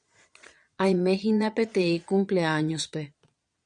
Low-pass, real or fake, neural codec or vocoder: 9.9 kHz; fake; vocoder, 22.05 kHz, 80 mel bands, Vocos